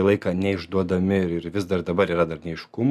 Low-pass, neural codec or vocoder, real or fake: 14.4 kHz; none; real